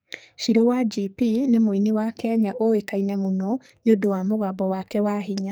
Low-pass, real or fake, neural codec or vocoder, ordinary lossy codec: none; fake; codec, 44.1 kHz, 2.6 kbps, SNAC; none